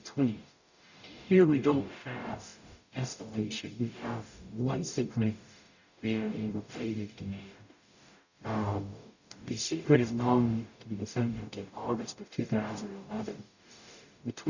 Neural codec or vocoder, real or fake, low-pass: codec, 44.1 kHz, 0.9 kbps, DAC; fake; 7.2 kHz